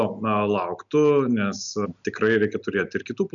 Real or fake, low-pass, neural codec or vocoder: real; 7.2 kHz; none